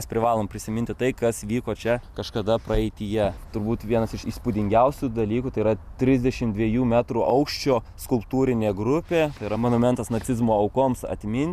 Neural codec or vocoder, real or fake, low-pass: none; real; 14.4 kHz